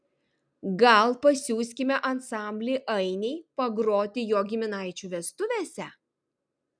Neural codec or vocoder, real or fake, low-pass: none; real; 9.9 kHz